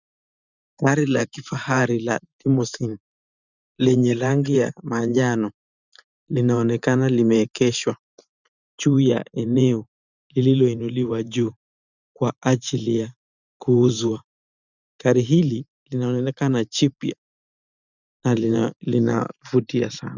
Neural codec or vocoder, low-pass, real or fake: vocoder, 44.1 kHz, 128 mel bands every 512 samples, BigVGAN v2; 7.2 kHz; fake